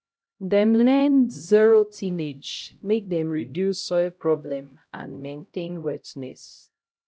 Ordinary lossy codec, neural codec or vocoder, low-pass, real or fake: none; codec, 16 kHz, 0.5 kbps, X-Codec, HuBERT features, trained on LibriSpeech; none; fake